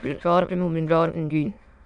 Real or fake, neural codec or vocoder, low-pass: fake; autoencoder, 22.05 kHz, a latent of 192 numbers a frame, VITS, trained on many speakers; 9.9 kHz